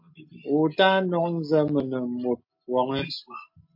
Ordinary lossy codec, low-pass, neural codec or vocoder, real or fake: MP3, 32 kbps; 5.4 kHz; none; real